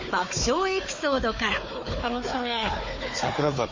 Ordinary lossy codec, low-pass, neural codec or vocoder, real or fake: MP3, 32 kbps; 7.2 kHz; codec, 16 kHz, 4 kbps, FunCodec, trained on Chinese and English, 50 frames a second; fake